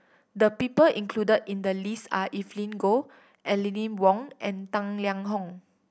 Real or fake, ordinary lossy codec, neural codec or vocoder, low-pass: real; none; none; none